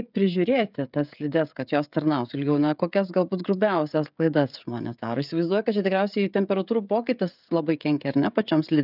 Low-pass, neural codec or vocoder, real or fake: 5.4 kHz; codec, 16 kHz, 16 kbps, FreqCodec, smaller model; fake